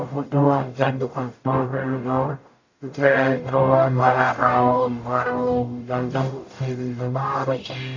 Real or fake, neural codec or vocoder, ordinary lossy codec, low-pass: fake; codec, 44.1 kHz, 0.9 kbps, DAC; AAC, 48 kbps; 7.2 kHz